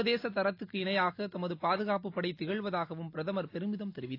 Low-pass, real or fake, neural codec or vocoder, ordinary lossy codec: 5.4 kHz; real; none; AAC, 32 kbps